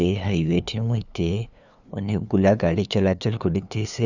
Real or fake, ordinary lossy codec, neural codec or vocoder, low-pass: fake; none; codec, 16 kHz, 2 kbps, FunCodec, trained on LibriTTS, 25 frames a second; 7.2 kHz